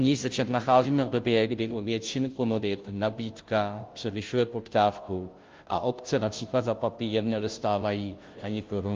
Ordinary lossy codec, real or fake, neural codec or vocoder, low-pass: Opus, 32 kbps; fake; codec, 16 kHz, 0.5 kbps, FunCodec, trained on Chinese and English, 25 frames a second; 7.2 kHz